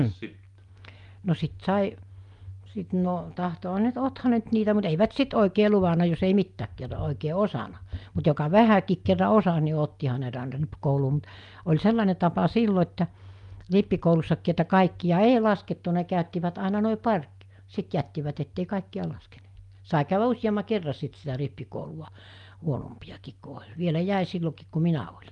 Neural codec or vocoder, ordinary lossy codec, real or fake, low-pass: none; Opus, 32 kbps; real; 10.8 kHz